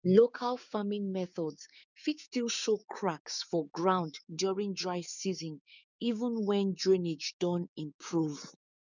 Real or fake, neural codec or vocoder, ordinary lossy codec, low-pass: fake; codec, 16 kHz, 6 kbps, DAC; none; 7.2 kHz